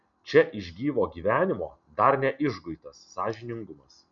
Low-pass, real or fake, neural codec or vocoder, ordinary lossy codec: 7.2 kHz; real; none; MP3, 96 kbps